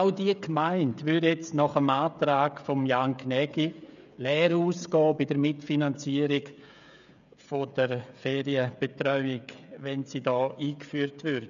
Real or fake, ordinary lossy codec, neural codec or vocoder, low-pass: fake; none; codec, 16 kHz, 16 kbps, FreqCodec, smaller model; 7.2 kHz